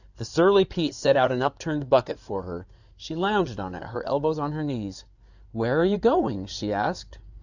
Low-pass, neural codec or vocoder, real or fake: 7.2 kHz; codec, 16 kHz in and 24 kHz out, 2.2 kbps, FireRedTTS-2 codec; fake